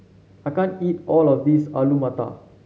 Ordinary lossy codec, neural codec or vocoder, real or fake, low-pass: none; none; real; none